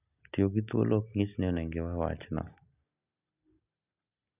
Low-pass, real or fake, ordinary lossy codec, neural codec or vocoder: 3.6 kHz; real; none; none